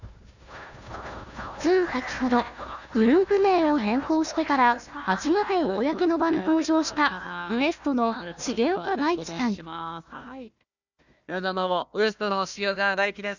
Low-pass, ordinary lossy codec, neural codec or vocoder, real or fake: 7.2 kHz; none; codec, 16 kHz, 1 kbps, FunCodec, trained on Chinese and English, 50 frames a second; fake